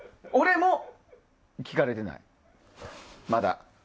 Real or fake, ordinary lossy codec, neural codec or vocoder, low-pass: real; none; none; none